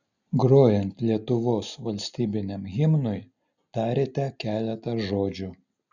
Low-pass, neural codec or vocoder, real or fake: 7.2 kHz; none; real